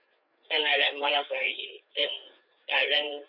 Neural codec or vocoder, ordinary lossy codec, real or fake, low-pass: codec, 16 kHz, 4 kbps, FreqCodec, larger model; none; fake; 5.4 kHz